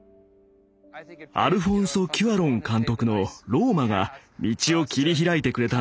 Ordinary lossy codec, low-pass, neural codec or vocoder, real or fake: none; none; none; real